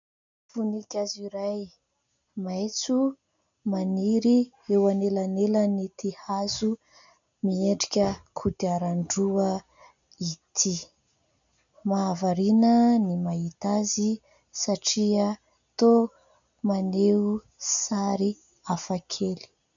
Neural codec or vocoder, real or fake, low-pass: none; real; 7.2 kHz